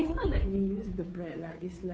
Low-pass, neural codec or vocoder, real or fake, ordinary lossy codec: none; codec, 16 kHz, 2 kbps, FunCodec, trained on Chinese and English, 25 frames a second; fake; none